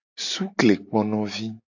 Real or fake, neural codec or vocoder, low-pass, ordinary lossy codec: real; none; 7.2 kHz; AAC, 48 kbps